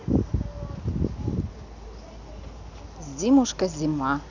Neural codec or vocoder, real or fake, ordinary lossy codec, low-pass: none; real; none; 7.2 kHz